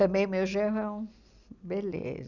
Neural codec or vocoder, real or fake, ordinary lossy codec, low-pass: none; real; none; 7.2 kHz